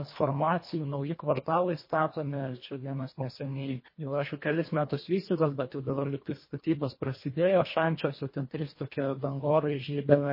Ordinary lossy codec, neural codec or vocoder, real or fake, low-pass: MP3, 24 kbps; codec, 24 kHz, 1.5 kbps, HILCodec; fake; 5.4 kHz